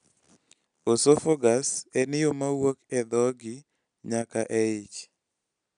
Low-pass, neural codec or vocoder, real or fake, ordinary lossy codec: 9.9 kHz; none; real; none